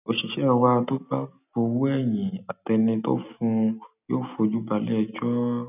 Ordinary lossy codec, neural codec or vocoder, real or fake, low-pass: none; none; real; 3.6 kHz